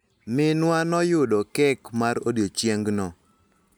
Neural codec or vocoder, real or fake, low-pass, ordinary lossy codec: none; real; none; none